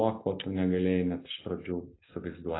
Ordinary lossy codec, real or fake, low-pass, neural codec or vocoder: AAC, 16 kbps; real; 7.2 kHz; none